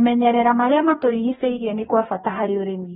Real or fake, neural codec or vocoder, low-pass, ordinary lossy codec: fake; codec, 44.1 kHz, 2.6 kbps, DAC; 19.8 kHz; AAC, 16 kbps